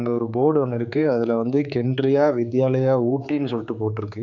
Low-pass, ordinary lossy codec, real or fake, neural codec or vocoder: 7.2 kHz; none; fake; codec, 16 kHz, 4 kbps, X-Codec, HuBERT features, trained on general audio